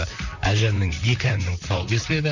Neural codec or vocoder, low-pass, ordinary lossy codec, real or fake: vocoder, 44.1 kHz, 128 mel bands, Pupu-Vocoder; 7.2 kHz; none; fake